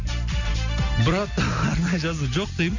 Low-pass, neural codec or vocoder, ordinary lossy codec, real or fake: 7.2 kHz; none; none; real